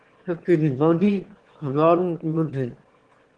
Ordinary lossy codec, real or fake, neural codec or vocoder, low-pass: Opus, 16 kbps; fake; autoencoder, 22.05 kHz, a latent of 192 numbers a frame, VITS, trained on one speaker; 9.9 kHz